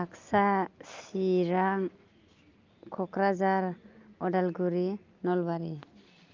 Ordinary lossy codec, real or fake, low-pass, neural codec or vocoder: Opus, 24 kbps; real; 7.2 kHz; none